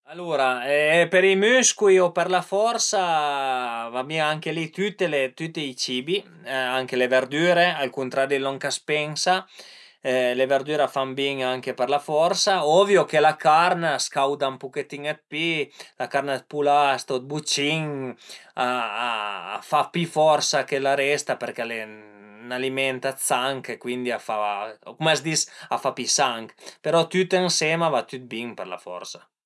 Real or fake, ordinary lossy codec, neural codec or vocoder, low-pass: real; none; none; none